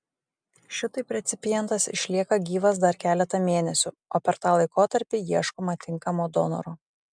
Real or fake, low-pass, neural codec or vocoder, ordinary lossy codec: real; 9.9 kHz; none; MP3, 96 kbps